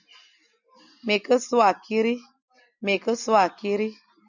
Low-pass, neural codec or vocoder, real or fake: 7.2 kHz; none; real